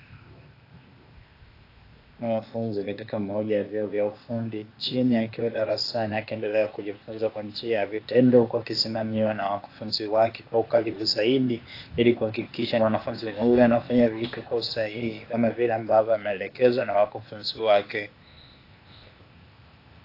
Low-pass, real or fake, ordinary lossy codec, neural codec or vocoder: 5.4 kHz; fake; AAC, 32 kbps; codec, 16 kHz, 0.8 kbps, ZipCodec